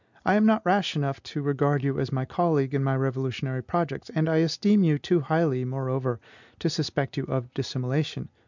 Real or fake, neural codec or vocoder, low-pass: real; none; 7.2 kHz